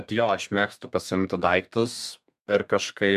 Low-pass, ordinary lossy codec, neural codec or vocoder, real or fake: 14.4 kHz; MP3, 96 kbps; codec, 32 kHz, 1.9 kbps, SNAC; fake